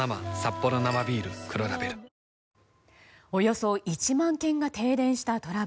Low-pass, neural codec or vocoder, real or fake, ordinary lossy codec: none; none; real; none